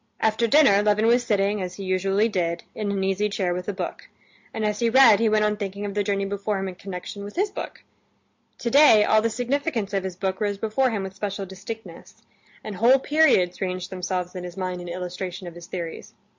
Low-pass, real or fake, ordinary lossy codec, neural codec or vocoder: 7.2 kHz; real; MP3, 64 kbps; none